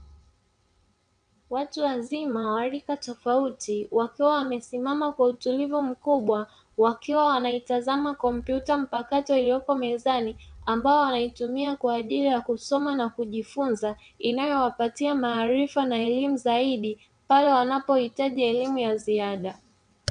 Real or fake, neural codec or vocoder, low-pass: fake; vocoder, 22.05 kHz, 80 mel bands, WaveNeXt; 9.9 kHz